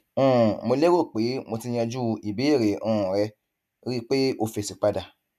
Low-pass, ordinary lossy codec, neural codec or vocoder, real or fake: 14.4 kHz; none; none; real